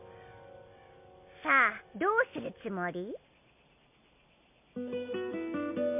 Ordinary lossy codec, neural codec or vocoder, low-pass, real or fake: MP3, 32 kbps; none; 3.6 kHz; real